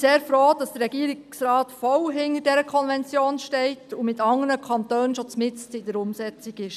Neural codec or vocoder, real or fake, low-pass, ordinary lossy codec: none; real; 14.4 kHz; none